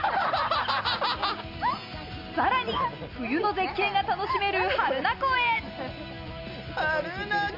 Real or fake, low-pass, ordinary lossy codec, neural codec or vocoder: real; 5.4 kHz; none; none